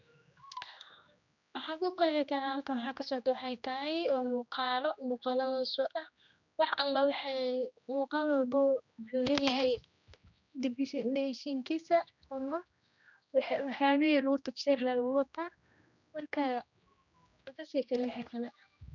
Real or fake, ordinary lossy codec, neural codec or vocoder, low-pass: fake; none; codec, 16 kHz, 1 kbps, X-Codec, HuBERT features, trained on general audio; 7.2 kHz